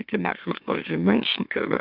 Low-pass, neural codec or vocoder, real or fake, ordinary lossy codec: 5.4 kHz; autoencoder, 44.1 kHz, a latent of 192 numbers a frame, MeloTTS; fake; MP3, 48 kbps